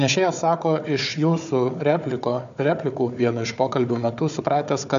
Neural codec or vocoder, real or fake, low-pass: codec, 16 kHz, 4 kbps, FunCodec, trained on Chinese and English, 50 frames a second; fake; 7.2 kHz